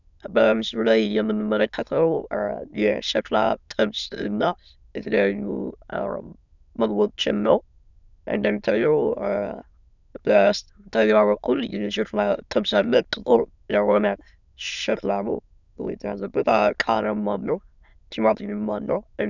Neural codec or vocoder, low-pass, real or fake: autoencoder, 22.05 kHz, a latent of 192 numbers a frame, VITS, trained on many speakers; 7.2 kHz; fake